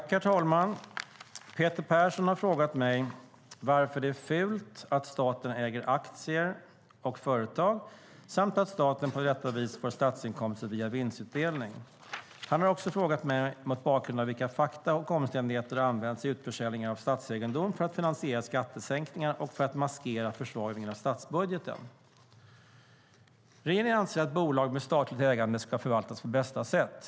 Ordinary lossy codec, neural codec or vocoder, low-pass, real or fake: none; none; none; real